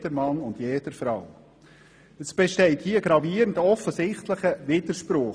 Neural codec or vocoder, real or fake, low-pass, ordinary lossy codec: none; real; none; none